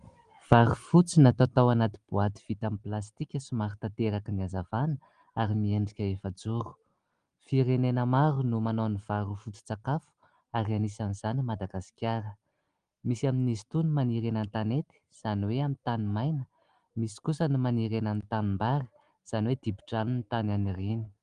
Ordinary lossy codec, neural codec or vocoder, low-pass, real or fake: Opus, 24 kbps; none; 9.9 kHz; real